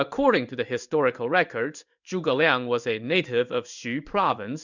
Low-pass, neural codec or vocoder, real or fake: 7.2 kHz; codec, 16 kHz in and 24 kHz out, 1 kbps, XY-Tokenizer; fake